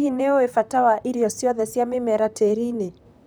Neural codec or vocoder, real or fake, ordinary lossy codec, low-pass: vocoder, 44.1 kHz, 128 mel bands, Pupu-Vocoder; fake; none; none